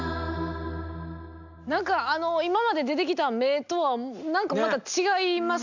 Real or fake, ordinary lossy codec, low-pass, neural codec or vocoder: real; none; 7.2 kHz; none